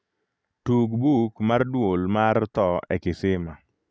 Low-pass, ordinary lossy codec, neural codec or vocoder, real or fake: none; none; none; real